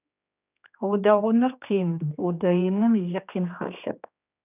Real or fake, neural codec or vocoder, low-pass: fake; codec, 16 kHz, 2 kbps, X-Codec, HuBERT features, trained on general audio; 3.6 kHz